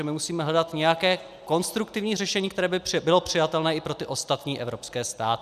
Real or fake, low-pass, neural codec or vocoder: real; 14.4 kHz; none